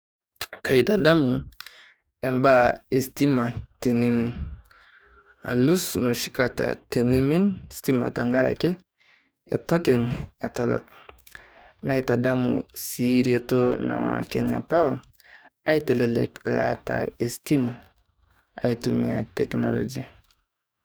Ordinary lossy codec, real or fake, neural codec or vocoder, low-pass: none; fake; codec, 44.1 kHz, 2.6 kbps, DAC; none